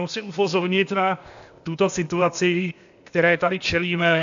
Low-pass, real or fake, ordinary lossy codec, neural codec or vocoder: 7.2 kHz; fake; AAC, 64 kbps; codec, 16 kHz, 0.8 kbps, ZipCodec